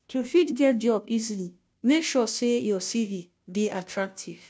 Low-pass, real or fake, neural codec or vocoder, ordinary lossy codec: none; fake; codec, 16 kHz, 0.5 kbps, FunCodec, trained on Chinese and English, 25 frames a second; none